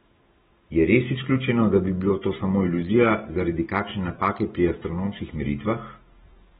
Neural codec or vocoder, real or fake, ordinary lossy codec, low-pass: none; real; AAC, 16 kbps; 19.8 kHz